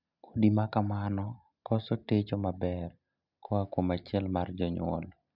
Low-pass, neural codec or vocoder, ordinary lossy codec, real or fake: 5.4 kHz; none; none; real